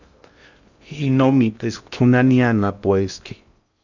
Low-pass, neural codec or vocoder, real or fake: 7.2 kHz; codec, 16 kHz in and 24 kHz out, 0.6 kbps, FocalCodec, streaming, 2048 codes; fake